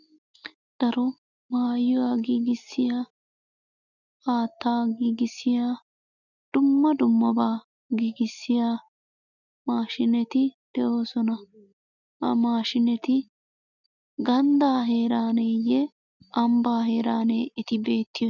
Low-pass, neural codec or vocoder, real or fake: 7.2 kHz; none; real